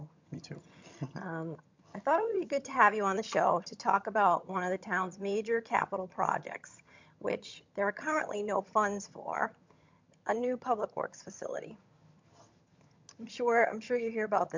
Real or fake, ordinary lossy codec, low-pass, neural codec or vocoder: fake; MP3, 64 kbps; 7.2 kHz; vocoder, 22.05 kHz, 80 mel bands, HiFi-GAN